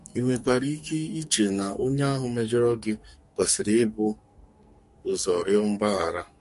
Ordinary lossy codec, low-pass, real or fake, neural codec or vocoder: MP3, 48 kbps; 14.4 kHz; fake; codec, 44.1 kHz, 2.6 kbps, SNAC